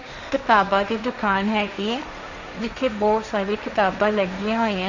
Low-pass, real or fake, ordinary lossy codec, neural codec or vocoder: 7.2 kHz; fake; none; codec, 16 kHz, 1.1 kbps, Voila-Tokenizer